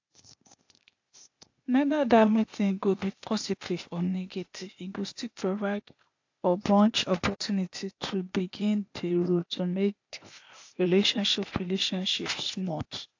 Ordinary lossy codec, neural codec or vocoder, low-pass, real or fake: AAC, 48 kbps; codec, 16 kHz, 0.8 kbps, ZipCodec; 7.2 kHz; fake